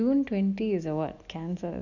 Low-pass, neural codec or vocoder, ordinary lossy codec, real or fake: 7.2 kHz; none; none; real